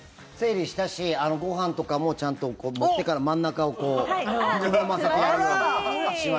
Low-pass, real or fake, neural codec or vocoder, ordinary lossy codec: none; real; none; none